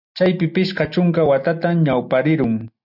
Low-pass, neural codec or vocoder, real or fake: 5.4 kHz; none; real